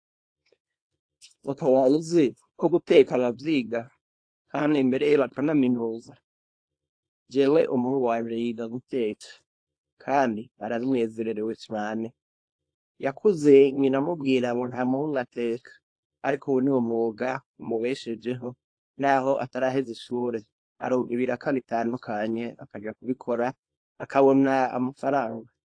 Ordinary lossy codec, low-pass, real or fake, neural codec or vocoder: AAC, 48 kbps; 9.9 kHz; fake; codec, 24 kHz, 0.9 kbps, WavTokenizer, small release